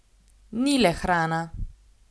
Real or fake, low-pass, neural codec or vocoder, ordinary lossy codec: real; none; none; none